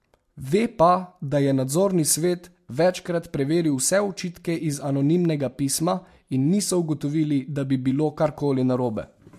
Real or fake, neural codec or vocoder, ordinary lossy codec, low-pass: real; none; MP3, 64 kbps; 14.4 kHz